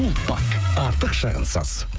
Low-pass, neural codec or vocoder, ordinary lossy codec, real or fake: none; codec, 16 kHz, 16 kbps, FreqCodec, smaller model; none; fake